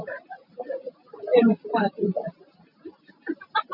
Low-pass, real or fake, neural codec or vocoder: 5.4 kHz; real; none